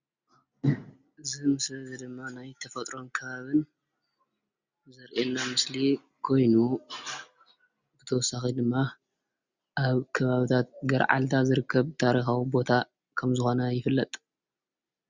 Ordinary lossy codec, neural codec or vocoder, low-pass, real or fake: Opus, 64 kbps; none; 7.2 kHz; real